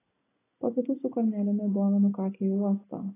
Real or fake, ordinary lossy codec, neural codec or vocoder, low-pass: real; AAC, 16 kbps; none; 3.6 kHz